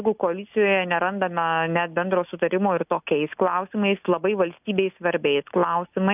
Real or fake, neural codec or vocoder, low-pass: real; none; 3.6 kHz